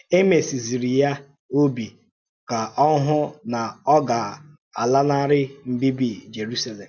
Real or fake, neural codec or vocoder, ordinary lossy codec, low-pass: real; none; MP3, 64 kbps; 7.2 kHz